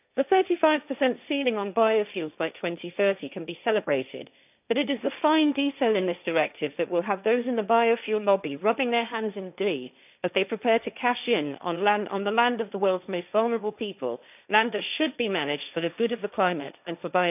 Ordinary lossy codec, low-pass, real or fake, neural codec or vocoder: none; 3.6 kHz; fake; codec, 16 kHz, 1.1 kbps, Voila-Tokenizer